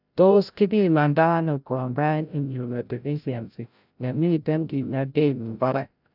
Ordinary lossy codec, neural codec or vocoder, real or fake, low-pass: none; codec, 16 kHz, 0.5 kbps, FreqCodec, larger model; fake; 5.4 kHz